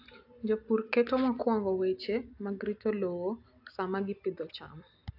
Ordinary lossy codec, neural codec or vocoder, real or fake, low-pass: AAC, 48 kbps; none; real; 5.4 kHz